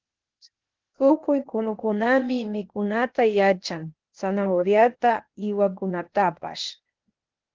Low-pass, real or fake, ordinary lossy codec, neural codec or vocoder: 7.2 kHz; fake; Opus, 16 kbps; codec, 16 kHz, 0.8 kbps, ZipCodec